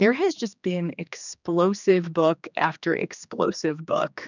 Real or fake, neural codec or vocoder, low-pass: fake; codec, 16 kHz, 2 kbps, X-Codec, HuBERT features, trained on general audio; 7.2 kHz